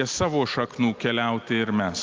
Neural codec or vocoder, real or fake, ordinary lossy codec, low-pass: none; real; Opus, 32 kbps; 7.2 kHz